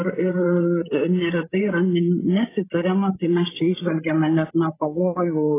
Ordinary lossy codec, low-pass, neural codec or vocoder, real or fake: AAC, 16 kbps; 3.6 kHz; codec, 16 kHz, 16 kbps, FreqCodec, larger model; fake